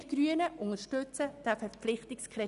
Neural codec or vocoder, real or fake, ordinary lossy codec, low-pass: none; real; none; 10.8 kHz